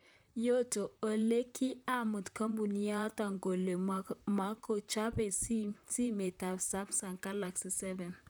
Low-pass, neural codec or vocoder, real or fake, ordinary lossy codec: none; vocoder, 44.1 kHz, 128 mel bands, Pupu-Vocoder; fake; none